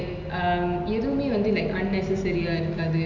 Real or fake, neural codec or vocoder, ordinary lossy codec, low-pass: real; none; none; 7.2 kHz